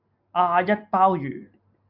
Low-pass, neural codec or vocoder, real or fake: 5.4 kHz; none; real